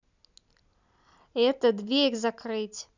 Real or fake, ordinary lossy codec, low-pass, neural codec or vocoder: real; none; 7.2 kHz; none